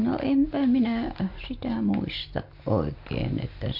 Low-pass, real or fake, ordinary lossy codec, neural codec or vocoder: 5.4 kHz; real; MP3, 48 kbps; none